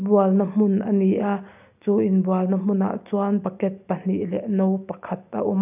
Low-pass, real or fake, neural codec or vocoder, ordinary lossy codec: 3.6 kHz; real; none; none